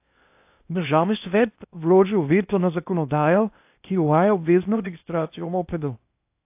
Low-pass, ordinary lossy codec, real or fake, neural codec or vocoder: 3.6 kHz; AAC, 32 kbps; fake; codec, 16 kHz in and 24 kHz out, 0.6 kbps, FocalCodec, streaming, 4096 codes